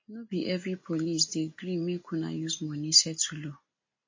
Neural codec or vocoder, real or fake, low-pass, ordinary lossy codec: none; real; 7.2 kHz; MP3, 32 kbps